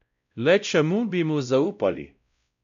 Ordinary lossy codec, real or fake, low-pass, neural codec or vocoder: none; fake; 7.2 kHz; codec, 16 kHz, 0.5 kbps, X-Codec, WavLM features, trained on Multilingual LibriSpeech